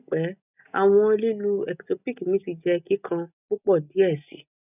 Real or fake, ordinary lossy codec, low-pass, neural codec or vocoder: real; none; 3.6 kHz; none